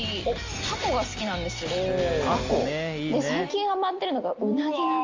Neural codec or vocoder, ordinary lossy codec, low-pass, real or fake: none; Opus, 32 kbps; 7.2 kHz; real